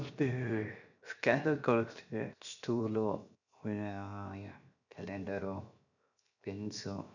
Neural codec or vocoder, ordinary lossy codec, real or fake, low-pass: codec, 16 kHz, 0.7 kbps, FocalCodec; none; fake; 7.2 kHz